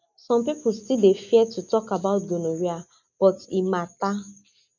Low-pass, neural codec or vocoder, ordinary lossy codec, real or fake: 7.2 kHz; none; none; real